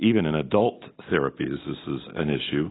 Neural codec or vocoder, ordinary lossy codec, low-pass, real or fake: none; AAC, 16 kbps; 7.2 kHz; real